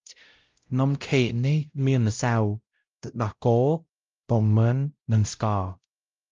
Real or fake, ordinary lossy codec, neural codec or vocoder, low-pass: fake; Opus, 32 kbps; codec, 16 kHz, 0.5 kbps, X-Codec, WavLM features, trained on Multilingual LibriSpeech; 7.2 kHz